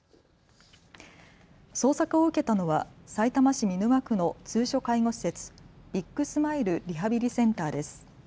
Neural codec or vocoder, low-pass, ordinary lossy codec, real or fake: none; none; none; real